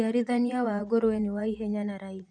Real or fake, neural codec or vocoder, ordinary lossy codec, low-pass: fake; vocoder, 24 kHz, 100 mel bands, Vocos; none; 9.9 kHz